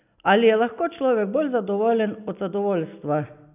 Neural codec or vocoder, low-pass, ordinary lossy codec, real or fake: none; 3.6 kHz; none; real